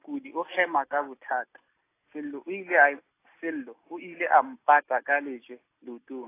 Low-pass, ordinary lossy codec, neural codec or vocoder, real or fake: 3.6 kHz; AAC, 24 kbps; none; real